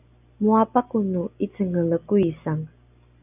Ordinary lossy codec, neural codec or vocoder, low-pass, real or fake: AAC, 32 kbps; none; 3.6 kHz; real